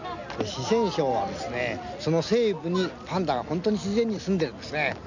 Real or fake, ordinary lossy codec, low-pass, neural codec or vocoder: real; none; 7.2 kHz; none